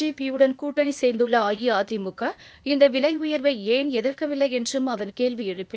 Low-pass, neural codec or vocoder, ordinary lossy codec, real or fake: none; codec, 16 kHz, 0.8 kbps, ZipCodec; none; fake